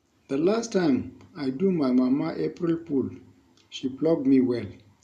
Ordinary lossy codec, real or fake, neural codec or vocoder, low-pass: none; real; none; 14.4 kHz